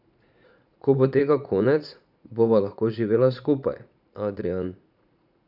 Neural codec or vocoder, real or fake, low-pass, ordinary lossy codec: vocoder, 44.1 kHz, 80 mel bands, Vocos; fake; 5.4 kHz; none